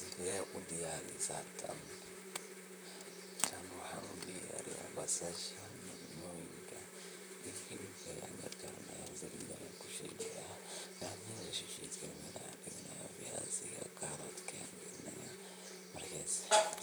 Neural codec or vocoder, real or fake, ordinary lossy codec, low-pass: vocoder, 44.1 kHz, 128 mel bands, Pupu-Vocoder; fake; none; none